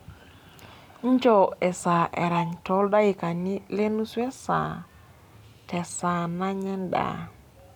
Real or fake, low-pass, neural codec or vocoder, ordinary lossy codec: fake; 19.8 kHz; vocoder, 44.1 kHz, 128 mel bands every 256 samples, BigVGAN v2; none